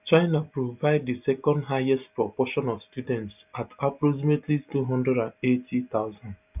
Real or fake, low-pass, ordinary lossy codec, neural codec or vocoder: real; 3.6 kHz; none; none